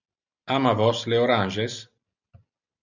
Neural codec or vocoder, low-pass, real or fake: none; 7.2 kHz; real